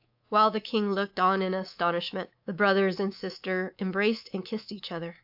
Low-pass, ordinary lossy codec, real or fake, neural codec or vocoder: 5.4 kHz; AAC, 48 kbps; fake; codec, 24 kHz, 3.1 kbps, DualCodec